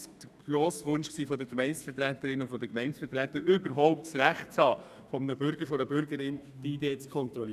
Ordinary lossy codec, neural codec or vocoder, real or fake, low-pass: none; codec, 32 kHz, 1.9 kbps, SNAC; fake; 14.4 kHz